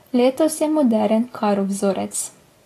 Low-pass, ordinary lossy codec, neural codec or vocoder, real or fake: 14.4 kHz; AAC, 48 kbps; none; real